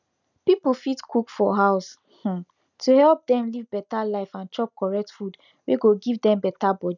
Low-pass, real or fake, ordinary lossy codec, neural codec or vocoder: 7.2 kHz; real; none; none